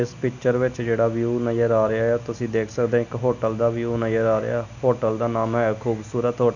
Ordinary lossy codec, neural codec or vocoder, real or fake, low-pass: none; none; real; 7.2 kHz